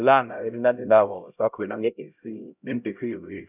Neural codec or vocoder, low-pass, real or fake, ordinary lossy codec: codec, 16 kHz, 0.5 kbps, X-Codec, HuBERT features, trained on LibriSpeech; 3.6 kHz; fake; none